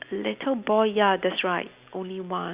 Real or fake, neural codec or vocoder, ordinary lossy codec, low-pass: real; none; Opus, 64 kbps; 3.6 kHz